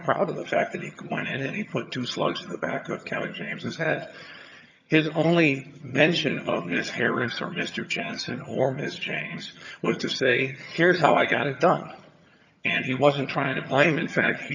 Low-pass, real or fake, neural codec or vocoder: 7.2 kHz; fake; vocoder, 22.05 kHz, 80 mel bands, HiFi-GAN